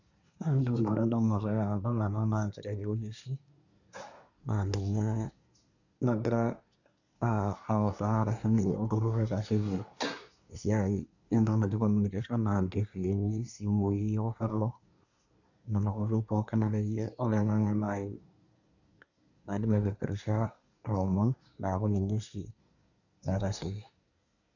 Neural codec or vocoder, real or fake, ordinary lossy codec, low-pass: codec, 24 kHz, 1 kbps, SNAC; fake; none; 7.2 kHz